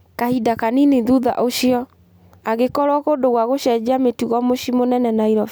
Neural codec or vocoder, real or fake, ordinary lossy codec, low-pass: none; real; none; none